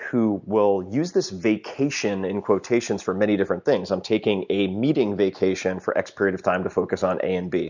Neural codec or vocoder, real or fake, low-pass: none; real; 7.2 kHz